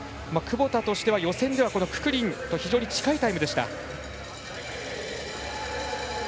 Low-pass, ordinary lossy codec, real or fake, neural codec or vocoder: none; none; real; none